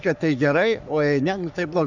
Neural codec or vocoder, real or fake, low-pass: codec, 44.1 kHz, 3.4 kbps, Pupu-Codec; fake; 7.2 kHz